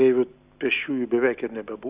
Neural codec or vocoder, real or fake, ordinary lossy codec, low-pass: none; real; Opus, 64 kbps; 3.6 kHz